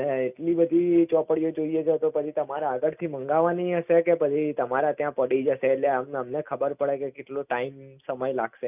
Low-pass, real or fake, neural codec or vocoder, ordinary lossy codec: 3.6 kHz; real; none; none